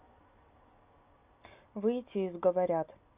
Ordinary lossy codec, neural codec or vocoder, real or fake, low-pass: Opus, 64 kbps; none; real; 3.6 kHz